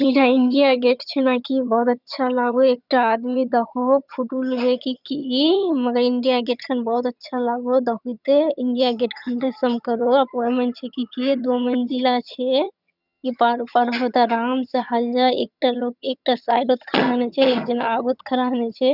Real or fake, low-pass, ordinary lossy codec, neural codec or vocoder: fake; 5.4 kHz; none; vocoder, 22.05 kHz, 80 mel bands, HiFi-GAN